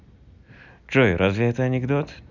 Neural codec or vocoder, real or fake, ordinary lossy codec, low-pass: none; real; none; 7.2 kHz